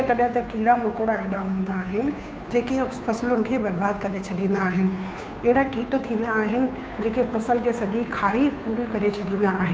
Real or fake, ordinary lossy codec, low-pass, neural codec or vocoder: fake; none; none; codec, 16 kHz, 2 kbps, FunCodec, trained on Chinese and English, 25 frames a second